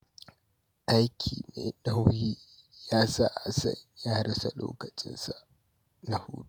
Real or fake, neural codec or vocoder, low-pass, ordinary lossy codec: fake; vocoder, 48 kHz, 128 mel bands, Vocos; none; none